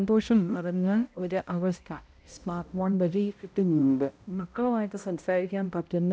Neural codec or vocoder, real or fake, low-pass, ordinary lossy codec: codec, 16 kHz, 0.5 kbps, X-Codec, HuBERT features, trained on balanced general audio; fake; none; none